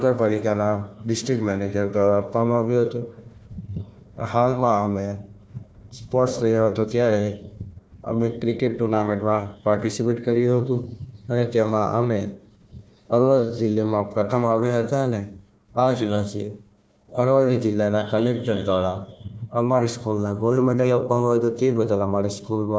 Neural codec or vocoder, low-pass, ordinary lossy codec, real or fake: codec, 16 kHz, 1 kbps, FunCodec, trained on Chinese and English, 50 frames a second; none; none; fake